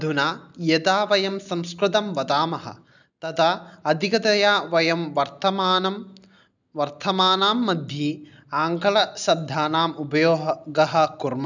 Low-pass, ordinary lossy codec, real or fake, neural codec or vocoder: 7.2 kHz; none; real; none